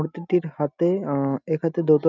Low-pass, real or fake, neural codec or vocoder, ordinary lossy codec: 7.2 kHz; real; none; none